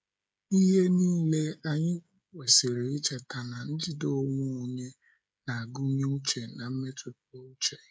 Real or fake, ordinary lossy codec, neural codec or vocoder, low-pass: fake; none; codec, 16 kHz, 16 kbps, FreqCodec, smaller model; none